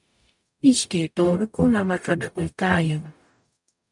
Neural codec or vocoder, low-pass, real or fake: codec, 44.1 kHz, 0.9 kbps, DAC; 10.8 kHz; fake